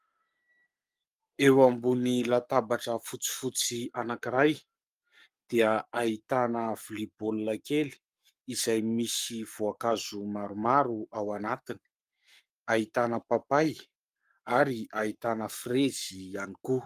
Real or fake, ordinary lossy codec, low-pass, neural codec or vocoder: fake; Opus, 32 kbps; 14.4 kHz; codec, 44.1 kHz, 7.8 kbps, Pupu-Codec